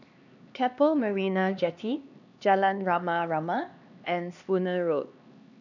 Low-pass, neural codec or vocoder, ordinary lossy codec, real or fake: 7.2 kHz; codec, 16 kHz, 2 kbps, X-Codec, HuBERT features, trained on LibriSpeech; none; fake